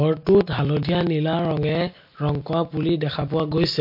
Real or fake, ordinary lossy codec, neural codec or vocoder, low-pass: real; MP3, 32 kbps; none; 5.4 kHz